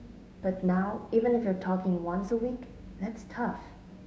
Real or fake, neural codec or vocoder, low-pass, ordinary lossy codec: fake; codec, 16 kHz, 6 kbps, DAC; none; none